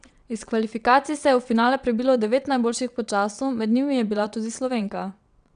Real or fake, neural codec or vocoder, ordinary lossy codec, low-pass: fake; vocoder, 22.05 kHz, 80 mel bands, WaveNeXt; none; 9.9 kHz